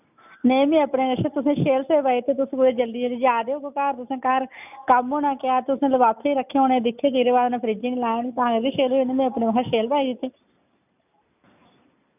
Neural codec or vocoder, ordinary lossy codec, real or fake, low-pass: none; none; real; 3.6 kHz